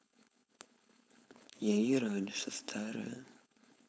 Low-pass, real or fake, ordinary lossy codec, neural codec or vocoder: none; fake; none; codec, 16 kHz, 4.8 kbps, FACodec